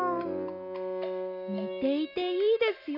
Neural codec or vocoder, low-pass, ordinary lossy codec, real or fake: none; 5.4 kHz; none; real